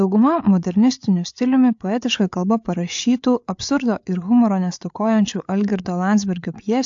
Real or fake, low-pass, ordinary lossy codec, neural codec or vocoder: fake; 7.2 kHz; AAC, 64 kbps; codec, 16 kHz, 8 kbps, FreqCodec, larger model